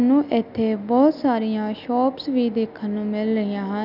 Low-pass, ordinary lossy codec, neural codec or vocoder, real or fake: 5.4 kHz; none; none; real